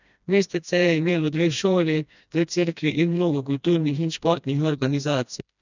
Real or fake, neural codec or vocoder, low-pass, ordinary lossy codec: fake; codec, 16 kHz, 1 kbps, FreqCodec, smaller model; 7.2 kHz; none